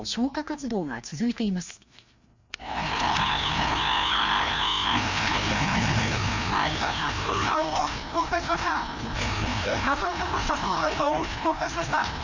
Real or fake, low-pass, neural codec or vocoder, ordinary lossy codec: fake; 7.2 kHz; codec, 16 kHz, 1 kbps, FreqCodec, larger model; Opus, 64 kbps